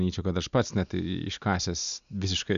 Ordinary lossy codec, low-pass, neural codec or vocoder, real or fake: AAC, 96 kbps; 7.2 kHz; none; real